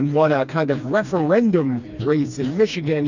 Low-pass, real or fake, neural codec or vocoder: 7.2 kHz; fake; codec, 16 kHz, 2 kbps, FreqCodec, smaller model